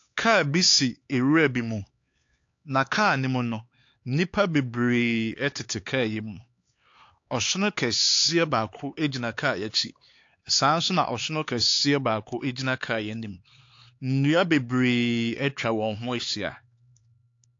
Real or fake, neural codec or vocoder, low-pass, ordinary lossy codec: fake; codec, 16 kHz, 4 kbps, X-Codec, HuBERT features, trained on LibriSpeech; 7.2 kHz; AAC, 48 kbps